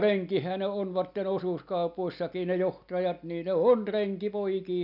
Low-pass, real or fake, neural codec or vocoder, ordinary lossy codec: 5.4 kHz; real; none; none